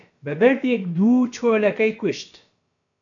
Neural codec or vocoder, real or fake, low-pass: codec, 16 kHz, about 1 kbps, DyCAST, with the encoder's durations; fake; 7.2 kHz